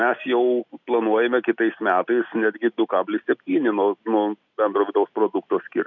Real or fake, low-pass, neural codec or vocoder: real; 7.2 kHz; none